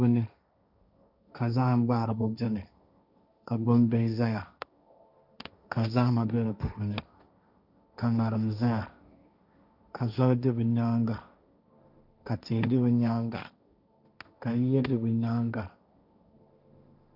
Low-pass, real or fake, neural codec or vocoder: 5.4 kHz; fake; codec, 16 kHz, 1.1 kbps, Voila-Tokenizer